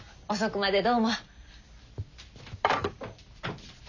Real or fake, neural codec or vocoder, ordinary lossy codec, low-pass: real; none; none; 7.2 kHz